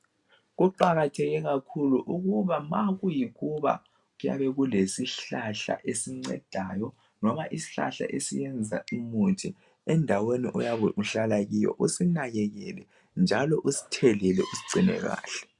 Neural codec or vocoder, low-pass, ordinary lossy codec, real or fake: none; 10.8 kHz; MP3, 96 kbps; real